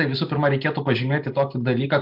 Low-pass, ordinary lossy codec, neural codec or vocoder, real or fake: 5.4 kHz; MP3, 48 kbps; none; real